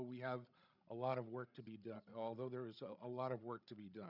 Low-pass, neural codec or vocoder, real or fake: 5.4 kHz; codec, 16 kHz, 16 kbps, FreqCodec, larger model; fake